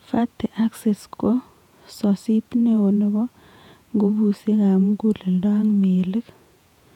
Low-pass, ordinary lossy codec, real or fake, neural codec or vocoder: 19.8 kHz; none; fake; vocoder, 48 kHz, 128 mel bands, Vocos